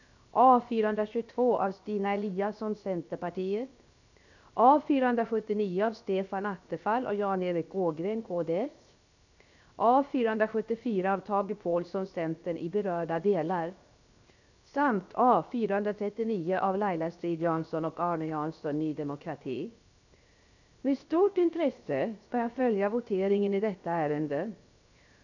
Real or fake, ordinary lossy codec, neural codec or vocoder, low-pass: fake; none; codec, 16 kHz, 0.7 kbps, FocalCodec; 7.2 kHz